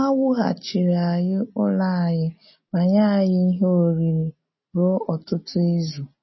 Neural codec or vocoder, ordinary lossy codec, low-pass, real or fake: none; MP3, 24 kbps; 7.2 kHz; real